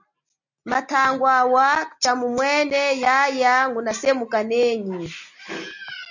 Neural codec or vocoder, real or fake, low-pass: none; real; 7.2 kHz